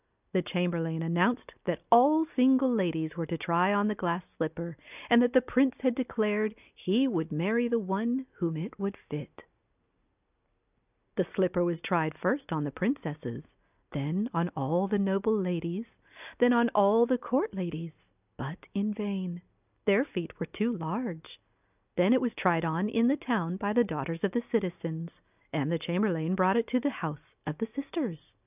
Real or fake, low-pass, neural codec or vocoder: real; 3.6 kHz; none